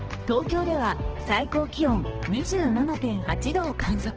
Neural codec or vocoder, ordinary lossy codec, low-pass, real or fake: codec, 16 kHz, 2 kbps, X-Codec, HuBERT features, trained on balanced general audio; Opus, 16 kbps; 7.2 kHz; fake